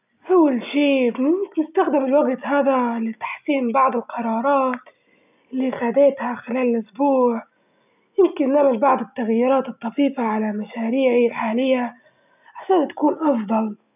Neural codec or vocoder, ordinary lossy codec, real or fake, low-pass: none; none; real; 3.6 kHz